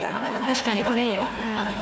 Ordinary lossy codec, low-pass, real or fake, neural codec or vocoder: none; none; fake; codec, 16 kHz, 1 kbps, FunCodec, trained on Chinese and English, 50 frames a second